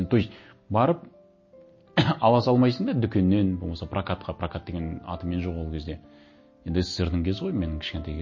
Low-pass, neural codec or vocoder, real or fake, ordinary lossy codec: 7.2 kHz; none; real; MP3, 32 kbps